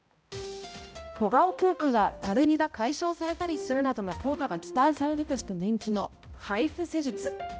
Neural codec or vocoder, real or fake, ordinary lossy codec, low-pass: codec, 16 kHz, 0.5 kbps, X-Codec, HuBERT features, trained on balanced general audio; fake; none; none